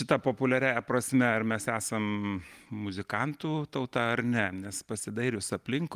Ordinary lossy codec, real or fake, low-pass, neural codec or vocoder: Opus, 32 kbps; real; 14.4 kHz; none